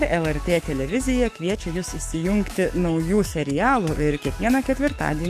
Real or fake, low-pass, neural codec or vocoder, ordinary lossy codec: fake; 14.4 kHz; codec, 44.1 kHz, 7.8 kbps, DAC; MP3, 64 kbps